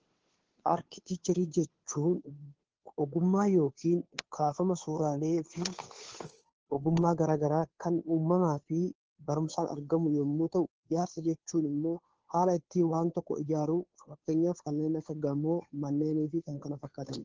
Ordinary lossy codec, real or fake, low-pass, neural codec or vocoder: Opus, 16 kbps; fake; 7.2 kHz; codec, 16 kHz, 2 kbps, FunCodec, trained on Chinese and English, 25 frames a second